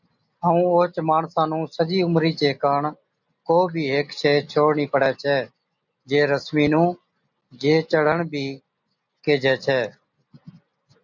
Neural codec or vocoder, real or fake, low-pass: none; real; 7.2 kHz